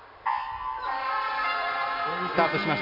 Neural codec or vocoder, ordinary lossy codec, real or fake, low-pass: vocoder, 44.1 kHz, 128 mel bands every 256 samples, BigVGAN v2; AAC, 48 kbps; fake; 5.4 kHz